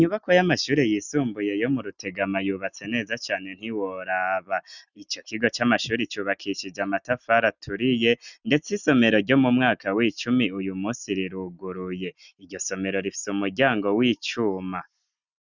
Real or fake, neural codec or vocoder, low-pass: real; none; 7.2 kHz